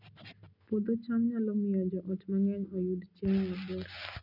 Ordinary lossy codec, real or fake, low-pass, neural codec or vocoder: none; real; 5.4 kHz; none